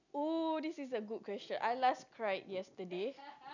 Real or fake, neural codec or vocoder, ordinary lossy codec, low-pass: real; none; none; 7.2 kHz